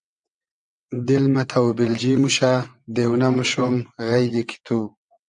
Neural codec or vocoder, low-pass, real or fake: vocoder, 22.05 kHz, 80 mel bands, WaveNeXt; 9.9 kHz; fake